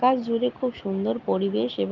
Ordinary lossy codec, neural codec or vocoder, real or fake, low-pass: Opus, 32 kbps; none; real; 7.2 kHz